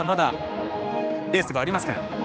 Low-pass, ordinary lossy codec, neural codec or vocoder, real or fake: none; none; codec, 16 kHz, 2 kbps, X-Codec, HuBERT features, trained on general audio; fake